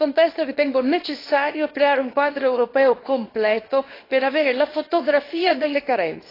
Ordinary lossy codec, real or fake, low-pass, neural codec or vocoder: AAC, 24 kbps; fake; 5.4 kHz; codec, 24 kHz, 0.9 kbps, WavTokenizer, small release